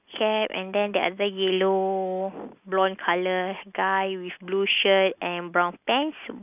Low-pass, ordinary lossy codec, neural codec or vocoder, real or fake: 3.6 kHz; none; none; real